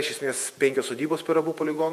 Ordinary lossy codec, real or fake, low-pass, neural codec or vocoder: MP3, 64 kbps; fake; 14.4 kHz; vocoder, 48 kHz, 128 mel bands, Vocos